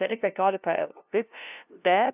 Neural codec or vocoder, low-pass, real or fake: codec, 16 kHz, 0.5 kbps, FunCodec, trained on LibriTTS, 25 frames a second; 3.6 kHz; fake